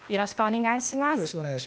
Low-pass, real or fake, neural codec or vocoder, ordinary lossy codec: none; fake; codec, 16 kHz, 0.8 kbps, ZipCodec; none